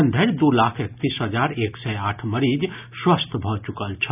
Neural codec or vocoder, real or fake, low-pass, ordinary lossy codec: none; real; 3.6 kHz; none